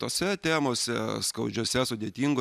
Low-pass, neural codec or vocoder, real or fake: 14.4 kHz; none; real